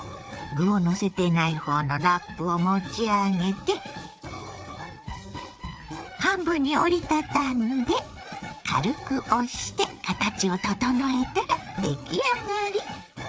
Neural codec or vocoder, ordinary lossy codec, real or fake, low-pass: codec, 16 kHz, 4 kbps, FreqCodec, larger model; none; fake; none